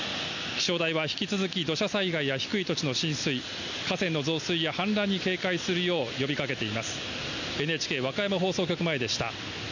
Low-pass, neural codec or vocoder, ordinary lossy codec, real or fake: 7.2 kHz; none; none; real